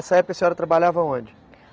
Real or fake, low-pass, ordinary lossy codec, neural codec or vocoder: real; none; none; none